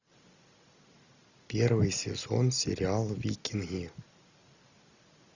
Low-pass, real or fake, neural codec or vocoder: 7.2 kHz; real; none